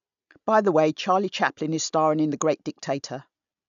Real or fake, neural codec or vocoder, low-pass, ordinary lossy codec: real; none; 7.2 kHz; none